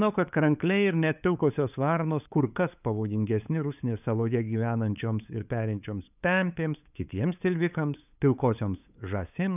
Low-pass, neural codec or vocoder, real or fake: 3.6 kHz; codec, 16 kHz, 8 kbps, FunCodec, trained on LibriTTS, 25 frames a second; fake